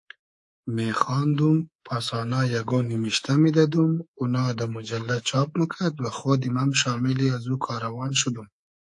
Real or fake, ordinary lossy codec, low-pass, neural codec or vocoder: fake; AAC, 64 kbps; 10.8 kHz; codec, 24 kHz, 3.1 kbps, DualCodec